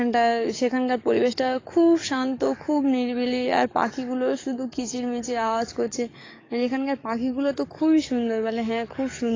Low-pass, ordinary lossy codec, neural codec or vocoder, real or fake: 7.2 kHz; AAC, 32 kbps; codec, 44.1 kHz, 7.8 kbps, DAC; fake